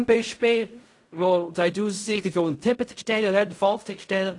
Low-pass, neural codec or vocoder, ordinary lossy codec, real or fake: 10.8 kHz; codec, 16 kHz in and 24 kHz out, 0.4 kbps, LongCat-Audio-Codec, fine tuned four codebook decoder; AAC, 48 kbps; fake